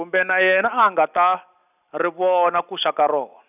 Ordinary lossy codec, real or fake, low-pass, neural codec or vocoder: none; real; 3.6 kHz; none